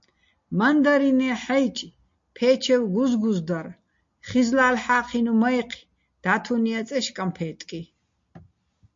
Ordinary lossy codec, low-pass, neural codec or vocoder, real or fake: MP3, 48 kbps; 7.2 kHz; none; real